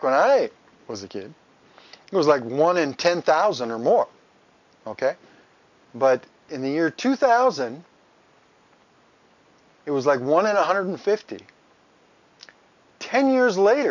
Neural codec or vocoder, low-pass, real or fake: none; 7.2 kHz; real